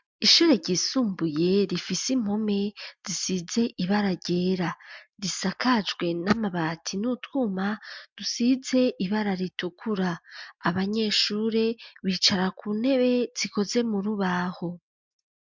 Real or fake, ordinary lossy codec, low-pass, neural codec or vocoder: real; MP3, 64 kbps; 7.2 kHz; none